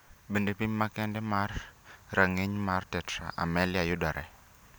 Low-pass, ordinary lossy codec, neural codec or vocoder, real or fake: none; none; none; real